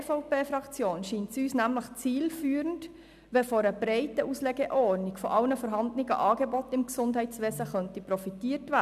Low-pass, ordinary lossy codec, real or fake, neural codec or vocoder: 14.4 kHz; none; real; none